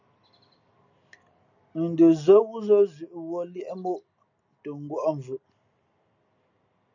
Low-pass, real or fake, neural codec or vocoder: 7.2 kHz; real; none